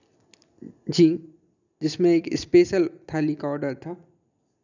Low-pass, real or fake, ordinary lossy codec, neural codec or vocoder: 7.2 kHz; real; none; none